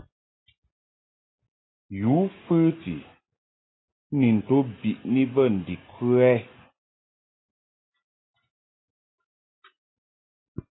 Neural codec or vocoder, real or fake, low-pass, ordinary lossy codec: none; real; 7.2 kHz; AAC, 16 kbps